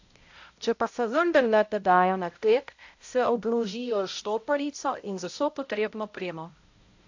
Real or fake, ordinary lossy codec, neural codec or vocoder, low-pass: fake; AAC, 48 kbps; codec, 16 kHz, 0.5 kbps, X-Codec, HuBERT features, trained on balanced general audio; 7.2 kHz